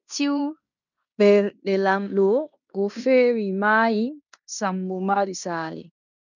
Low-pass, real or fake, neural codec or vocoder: 7.2 kHz; fake; codec, 16 kHz in and 24 kHz out, 0.9 kbps, LongCat-Audio-Codec, fine tuned four codebook decoder